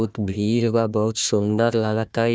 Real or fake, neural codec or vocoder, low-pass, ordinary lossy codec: fake; codec, 16 kHz, 1 kbps, FunCodec, trained on Chinese and English, 50 frames a second; none; none